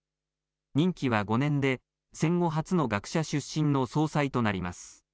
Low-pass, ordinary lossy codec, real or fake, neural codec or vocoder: none; none; real; none